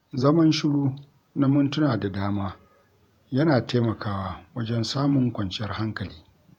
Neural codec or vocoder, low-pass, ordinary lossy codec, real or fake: vocoder, 48 kHz, 128 mel bands, Vocos; 19.8 kHz; none; fake